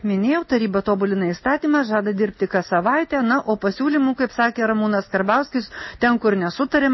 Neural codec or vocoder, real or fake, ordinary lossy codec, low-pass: none; real; MP3, 24 kbps; 7.2 kHz